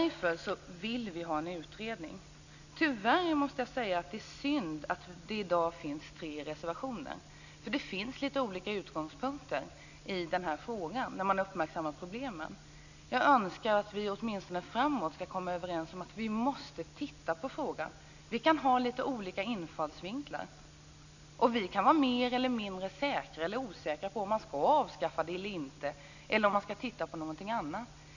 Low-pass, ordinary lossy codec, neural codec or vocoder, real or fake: 7.2 kHz; none; none; real